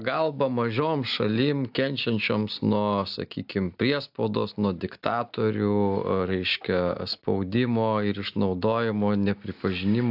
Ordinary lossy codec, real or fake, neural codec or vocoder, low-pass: AAC, 48 kbps; real; none; 5.4 kHz